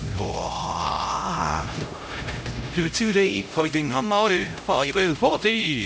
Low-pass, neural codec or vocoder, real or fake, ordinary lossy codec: none; codec, 16 kHz, 0.5 kbps, X-Codec, HuBERT features, trained on LibriSpeech; fake; none